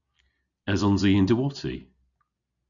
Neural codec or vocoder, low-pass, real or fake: none; 7.2 kHz; real